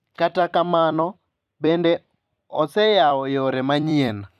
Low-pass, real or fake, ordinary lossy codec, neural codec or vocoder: 14.4 kHz; fake; none; vocoder, 44.1 kHz, 128 mel bands every 256 samples, BigVGAN v2